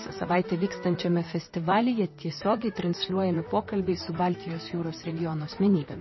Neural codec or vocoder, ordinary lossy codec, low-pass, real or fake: vocoder, 44.1 kHz, 128 mel bands, Pupu-Vocoder; MP3, 24 kbps; 7.2 kHz; fake